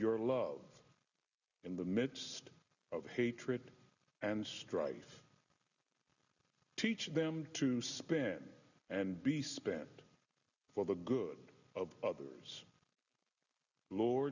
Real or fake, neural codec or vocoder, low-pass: real; none; 7.2 kHz